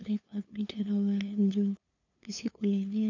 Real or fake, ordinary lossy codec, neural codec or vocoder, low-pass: fake; none; codec, 16 kHz, 2 kbps, FunCodec, trained on LibriTTS, 25 frames a second; 7.2 kHz